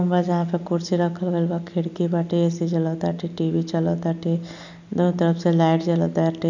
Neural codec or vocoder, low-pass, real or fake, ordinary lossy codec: none; 7.2 kHz; real; none